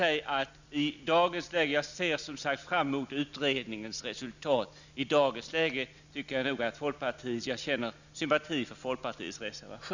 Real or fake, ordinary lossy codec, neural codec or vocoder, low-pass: real; none; none; 7.2 kHz